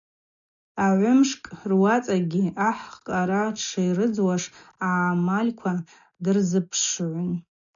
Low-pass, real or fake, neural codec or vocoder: 7.2 kHz; real; none